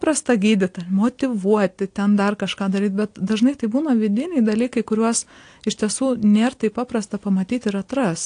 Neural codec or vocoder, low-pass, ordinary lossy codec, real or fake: none; 9.9 kHz; AAC, 48 kbps; real